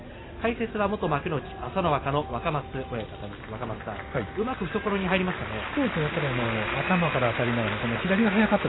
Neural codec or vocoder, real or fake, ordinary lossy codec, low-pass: none; real; AAC, 16 kbps; 7.2 kHz